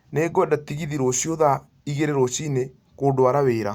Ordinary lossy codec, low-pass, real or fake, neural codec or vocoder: Opus, 64 kbps; 19.8 kHz; real; none